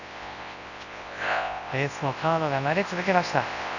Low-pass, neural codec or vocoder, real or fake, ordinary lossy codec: 7.2 kHz; codec, 24 kHz, 0.9 kbps, WavTokenizer, large speech release; fake; none